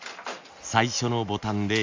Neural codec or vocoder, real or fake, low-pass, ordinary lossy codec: none; real; 7.2 kHz; MP3, 64 kbps